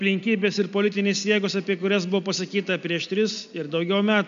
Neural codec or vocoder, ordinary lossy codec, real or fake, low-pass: none; MP3, 64 kbps; real; 7.2 kHz